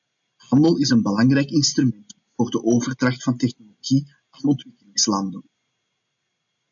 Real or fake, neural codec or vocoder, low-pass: real; none; 7.2 kHz